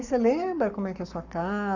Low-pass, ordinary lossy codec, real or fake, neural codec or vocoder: 7.2 kHz; Opus, 64 kbps; fake; codec, 44.1 kHz, 7.8 kbps, Pupu-Codec